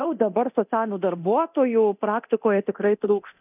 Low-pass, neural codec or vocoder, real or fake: 3.6 kHz; codec, 24 kHz, 0.9 kbps, DualCodec; fake